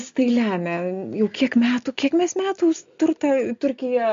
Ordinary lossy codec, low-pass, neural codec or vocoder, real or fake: MP3, 48 kbps; 7.2 kHz; none; real